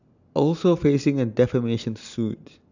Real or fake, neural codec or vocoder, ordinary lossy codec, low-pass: real; none; none; 7.2 kHz